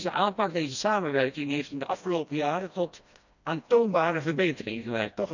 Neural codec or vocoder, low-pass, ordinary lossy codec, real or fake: codec, 16 kHz, 1 kbps, FreqCodec, smaller model; 7.2 kHz; none; fake